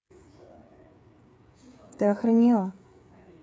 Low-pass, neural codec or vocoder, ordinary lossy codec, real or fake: none; codec, 16 kHz, 8 kbps, FreqCodec, smaller model; none; fake